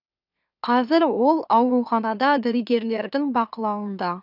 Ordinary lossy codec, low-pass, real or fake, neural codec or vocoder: none; 5.4 kHz; fake; autoencoder, 44.1 kHz, a latent of 192 numbers a frame, MeloTTS